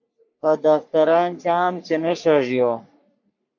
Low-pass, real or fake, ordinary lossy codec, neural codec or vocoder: 7.2 kHz; fake; MP3, 48 kbps; codec, 44.1 kHz, 3.4 kbps, Pupu-Codec